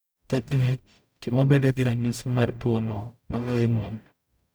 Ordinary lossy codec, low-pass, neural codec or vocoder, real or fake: none; none; codec, 44.1 kHz, 0.9 kbps, DAC; fake